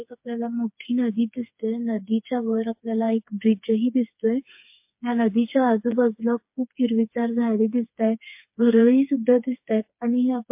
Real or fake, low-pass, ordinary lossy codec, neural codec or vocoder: fake; 3.6 kHz; MP3, 32 kbps; codec, 16 kHz, 4 kbps, FreqCodec, smaller model